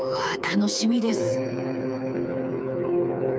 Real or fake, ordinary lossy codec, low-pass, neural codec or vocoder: fake; none; none; codec, 16 kHz, 4 kbps, FreqCodec, smaller model